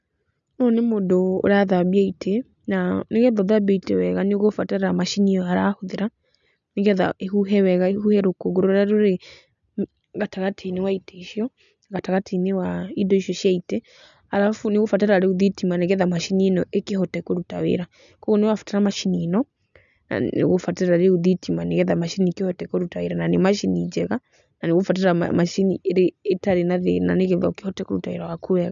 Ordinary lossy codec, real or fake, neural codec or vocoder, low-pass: none; real; none; 7.2 kHz